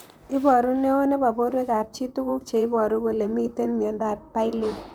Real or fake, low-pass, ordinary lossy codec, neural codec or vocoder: fake; none; none; vocoder, 44.1 kHz, 128 mel bands, Pupu-Vocoder